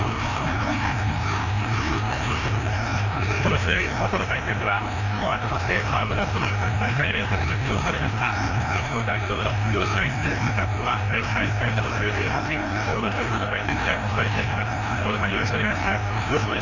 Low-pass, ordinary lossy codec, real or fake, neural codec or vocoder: 7.2 kHz; none; fake; codec, 16 kHz, 1 kbps, FreqCodec, larger model